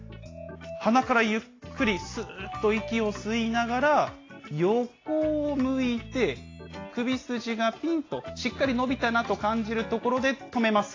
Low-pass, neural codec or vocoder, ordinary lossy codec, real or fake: 7.2 kHz; none; AAC, 32 kbps; real